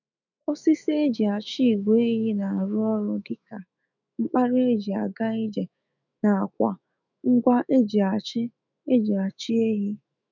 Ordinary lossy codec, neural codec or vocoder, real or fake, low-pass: none; autoencoder, 48 kHz, 128 numbers a frame, DAC-VAE, trained on Japanese speech; fake; 7.2 kHz